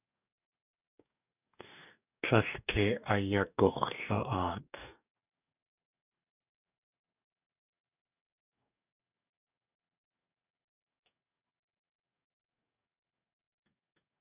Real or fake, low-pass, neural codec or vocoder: fake; 3.6 kHz; codec, 44.1 kHz, 2.6 kbps, DAC